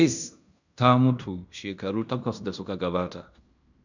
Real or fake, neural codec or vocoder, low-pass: fake; codec, 16 kHz in and 24 kHz out, 0.9 kbps, LongCat-Audio-Codec, fine tuned four codebook decoder; 7.2 kHz